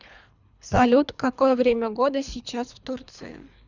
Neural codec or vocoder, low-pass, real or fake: codec, 24 kHz, 3 kbps, HILCodec; 7.2 kHz; fake